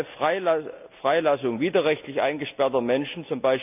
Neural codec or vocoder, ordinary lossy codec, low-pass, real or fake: none; none; 3.6 kHz; real